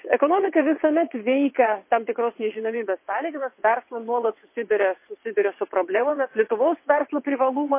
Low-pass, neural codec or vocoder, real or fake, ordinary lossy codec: 3.6 kHz; vocoder, 22.05 kHz, 80 mel bands, WaveNeXt; fake; MP3, 24 kbps